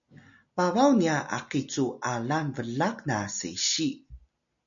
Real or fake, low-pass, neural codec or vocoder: real; 7.2 kHz; none